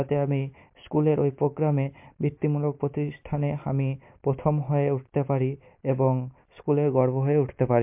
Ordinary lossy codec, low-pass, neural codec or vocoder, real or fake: MP3, 32 kbps; 3.6 kHz; none; real